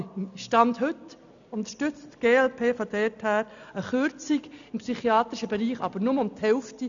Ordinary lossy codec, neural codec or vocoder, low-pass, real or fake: none; none; 7.2 kHz; real